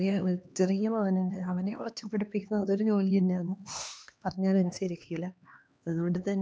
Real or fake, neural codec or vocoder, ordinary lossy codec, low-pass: fake; codec, 16 kHz, 2 kbps, X-Codec, HuBERT features, trained on LibriSpeech; none; none